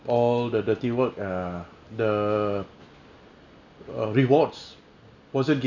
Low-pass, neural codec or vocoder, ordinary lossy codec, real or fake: 7.2 kHz; none; none; real